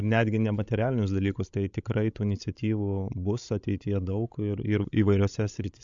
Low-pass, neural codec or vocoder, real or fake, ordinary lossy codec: 7.2 kHz; codec, 16 kHz, 16 kbps, FreqCodec, larger model; fake; MP3, 64 kbps